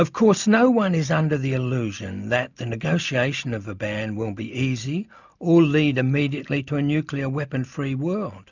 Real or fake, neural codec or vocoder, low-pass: real; none; 7.2 kHz